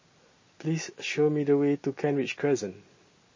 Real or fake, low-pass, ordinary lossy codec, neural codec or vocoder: real; 7.2 kHz; MP3, 32 kbps; none